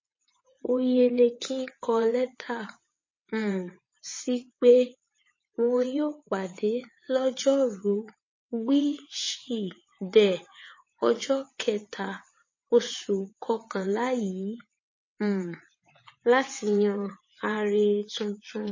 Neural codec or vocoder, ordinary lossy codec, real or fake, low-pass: vocoder, 22.05 kHz, 80 mel bands, WaveNeXt; MP3, 32 kbps; fake; 7.2 kHz